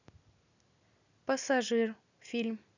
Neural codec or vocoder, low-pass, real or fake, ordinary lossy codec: none; 7.2 kHz; real; none